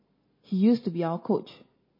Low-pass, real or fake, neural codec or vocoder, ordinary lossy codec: 5.4 kHz; real; none; MP3, 24 kbps